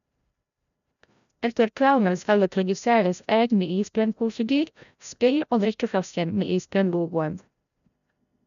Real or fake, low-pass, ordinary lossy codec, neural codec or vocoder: fake; 7.2 kHz; none; codec, 16 kHz, 0.5 kbps, FreqCodec, larger model